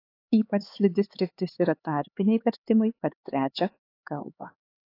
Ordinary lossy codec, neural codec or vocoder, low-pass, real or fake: AAC, 32 kbps; codec, 16 kHz, 4 kbps, X-Codec, HuBERT features, trained on LibriSpeech; 5.4 kHz; fake